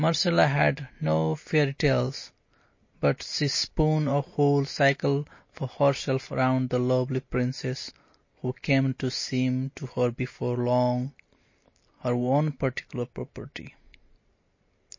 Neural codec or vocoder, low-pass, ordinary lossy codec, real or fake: none; 7.2 kHz; MP3, 32 kbps; real